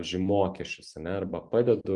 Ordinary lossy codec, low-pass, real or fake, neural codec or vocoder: Opus, 32 kbps; 10.8 kHz; real; none